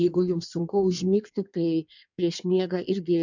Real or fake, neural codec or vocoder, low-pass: fake; codec, 16 kHz in and 24 kHz out, 1.1 kbps, FireRedTTS-2 codec; 7.2 kHz